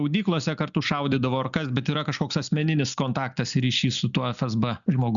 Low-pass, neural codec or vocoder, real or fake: 7.2 kHz; none; real